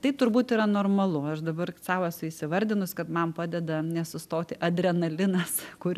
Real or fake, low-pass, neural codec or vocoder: real; 14.4 kHz; none